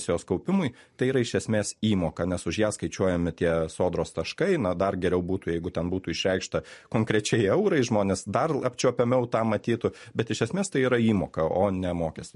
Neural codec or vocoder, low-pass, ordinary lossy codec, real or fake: none; 14.4 kHz; MP3, 48 kbps; real